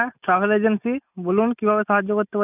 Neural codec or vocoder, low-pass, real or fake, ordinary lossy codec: none; 3.6 kHz; real; none